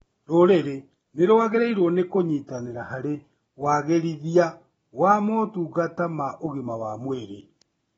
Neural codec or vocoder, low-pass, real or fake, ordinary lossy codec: none; 10.8 kHz; real; AAC, 24 kbps